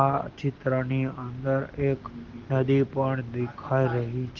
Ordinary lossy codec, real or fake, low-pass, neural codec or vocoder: Opus, 16 kbps; real; 7.2 kHz; none